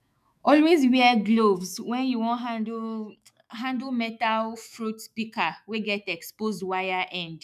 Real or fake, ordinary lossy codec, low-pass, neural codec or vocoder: fake; none; 14.4 kHz; autoencoder, 48 kHz, 128 numbers a frame, DAC-VAE, trained on Japanese speech